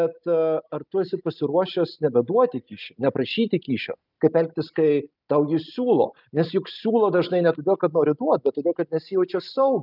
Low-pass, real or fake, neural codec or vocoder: 5.4 kHz; real; none